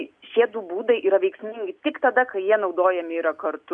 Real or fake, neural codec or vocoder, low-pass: real; none; 9.9 kHz